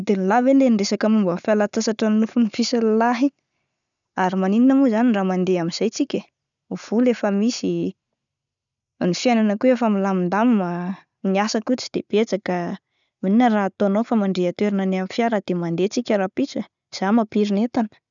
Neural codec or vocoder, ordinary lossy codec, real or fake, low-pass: none; none; real; 7.2 kHz